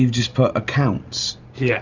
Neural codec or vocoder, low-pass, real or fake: none; 7.2 kHz; real